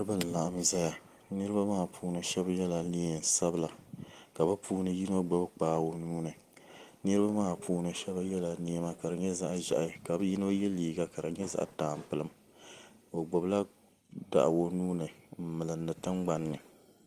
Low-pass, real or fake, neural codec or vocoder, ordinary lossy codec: 14.4 kHz; real; none; Opus, 24 kbps